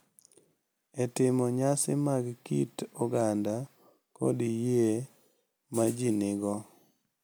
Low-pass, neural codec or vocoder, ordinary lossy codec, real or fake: none; none; none; real